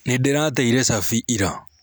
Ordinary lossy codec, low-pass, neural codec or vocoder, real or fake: none; none; none; real